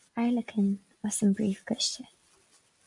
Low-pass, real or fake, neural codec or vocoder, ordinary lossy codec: 10.8 kHz; real; none; MP3, 96 kbps